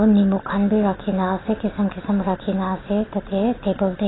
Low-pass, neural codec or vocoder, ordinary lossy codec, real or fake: 7.2 kHz; none; AAC, 16 kbps; real